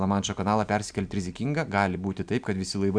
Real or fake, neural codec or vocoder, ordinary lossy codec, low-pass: real; none; MP3, 96 kbps; 9.9 kHz